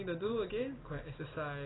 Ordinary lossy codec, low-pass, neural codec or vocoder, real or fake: AAC, 16 kbps; 7.2 kHz; none; real